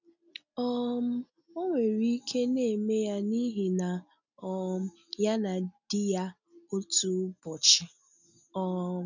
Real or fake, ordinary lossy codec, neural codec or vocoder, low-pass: real; none; none; 7.2 kHz